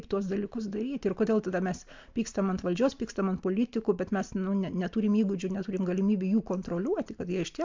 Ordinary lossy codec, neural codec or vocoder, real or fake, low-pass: MP3, 64 kbps; none; real; 7.2 kHz